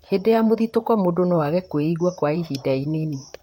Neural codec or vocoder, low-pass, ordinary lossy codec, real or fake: vocoder, 44.1 kHz, 128 mel bands, Pupu-Vocoder; 19.8 kHz; MP3, 64 kbps; fake